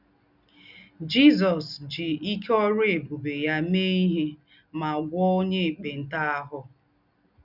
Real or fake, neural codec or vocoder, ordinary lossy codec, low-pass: real; none; none; 5.4 kHz